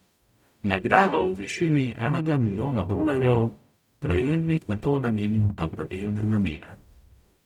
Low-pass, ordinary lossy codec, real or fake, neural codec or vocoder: 19.8 kHz; none; fake; codec, 44.1 kHz, 0.9 kbps, DAC